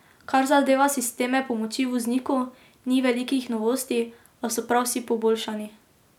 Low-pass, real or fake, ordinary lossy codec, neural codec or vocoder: 19.8 kHz; real; none; none